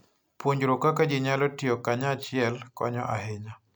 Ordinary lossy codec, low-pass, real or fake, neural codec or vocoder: none; none; real; none